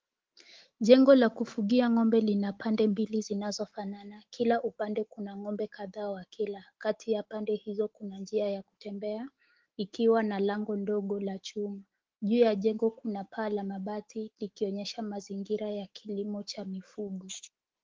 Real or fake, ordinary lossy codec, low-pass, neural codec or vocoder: fake; Opus, 16 kbps; 7.2 kHz; autoencoder, 48 kHz, 128 numbers a frame, DAC-VAE, trained on Japanese speech